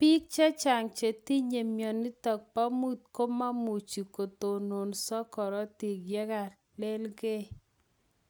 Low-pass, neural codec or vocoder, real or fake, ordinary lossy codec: none; none; real; none